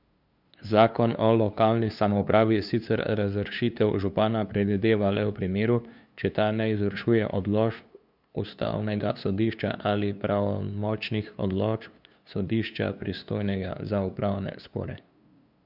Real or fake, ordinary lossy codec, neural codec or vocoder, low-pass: fake; none; codec, 16 kHz, 2 kbps, FunCodec, trained on LibriTTS, 25 frames a second; 5.4 kHz